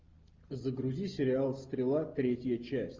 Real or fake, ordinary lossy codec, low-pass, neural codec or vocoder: real; Opus, 32 kbps; 7.2 kHz; none